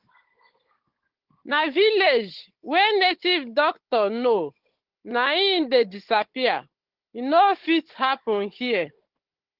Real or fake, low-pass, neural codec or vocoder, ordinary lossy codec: fake; 5.4 kHz; codec, 16 kHz, 16 kbps, FunCodec, trained on Chinese and English, 50 frames a second; Opus, 16 kbps